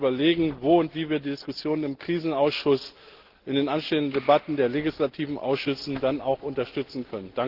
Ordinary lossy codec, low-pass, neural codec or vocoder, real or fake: Opus, 16 kbps; 5.4 kHz; none; real